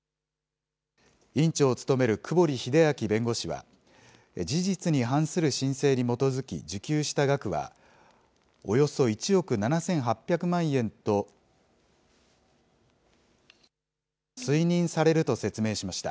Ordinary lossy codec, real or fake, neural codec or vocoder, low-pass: none; real; none; none